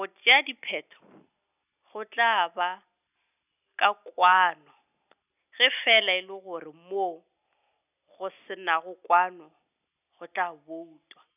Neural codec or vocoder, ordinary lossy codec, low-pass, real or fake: none; none; 3.6 kHz; real